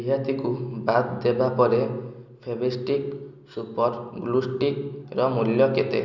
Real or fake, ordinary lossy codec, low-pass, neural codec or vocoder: real; none; 7.2 kHz; none